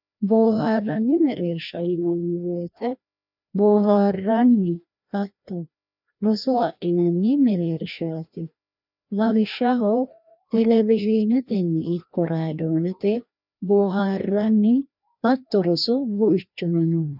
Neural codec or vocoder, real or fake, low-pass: codec, 16 kHz, 1 kbps, FreqCodec, larger model; fake; 5.4 kHz